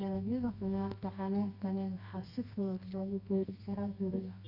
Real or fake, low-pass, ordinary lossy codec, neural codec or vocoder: fake; 5.4 kHz; none; codec, 24 kHz, 0.9 kbps, WavTokenizer, medium music audio release